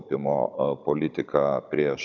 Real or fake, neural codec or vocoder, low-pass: fake; codec, 16 kHz, 16 kbps, FunCodec, trained on Chinese and English, 50 frames a second; 7.2 kHz